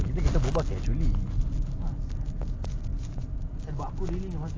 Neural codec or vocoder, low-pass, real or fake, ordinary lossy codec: none; 7.2 kHz; real; AAC, 32 kbps